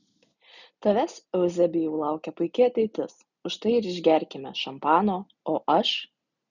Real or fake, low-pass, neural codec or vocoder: real; 7.2 kHz; none